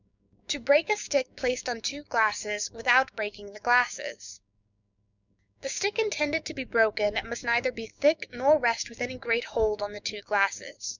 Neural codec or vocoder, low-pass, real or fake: codec, 16 kHz, 6 kbps, DAC; 7.2 kHz; fake